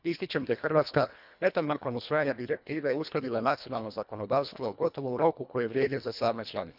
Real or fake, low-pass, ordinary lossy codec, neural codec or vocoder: fake; 5.4 kHz; none; codec, 24 kHz, 1.5 kbps, HILCodec